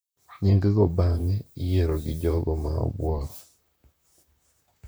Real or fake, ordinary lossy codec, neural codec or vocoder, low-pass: fake; none; vocoder, 44.1 kHz, 128 mel bands, Pupu-Vocoder; none